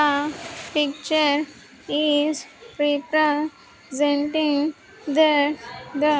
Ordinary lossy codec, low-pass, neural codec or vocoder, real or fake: none; none; none; real